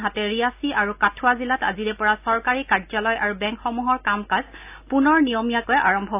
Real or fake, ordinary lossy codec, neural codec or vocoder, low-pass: real; AAC, 32 kbps; none; 3.6 kHz